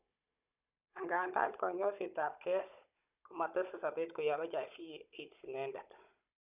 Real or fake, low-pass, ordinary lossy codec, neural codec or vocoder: fake; 3.6 kHz; none; codec, 16 kHz, 16 kbps, FunCodec, trained on Chinese and English, 50 frames a second